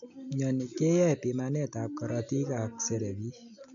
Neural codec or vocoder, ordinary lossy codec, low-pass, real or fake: none; none; 7.2 kHz; real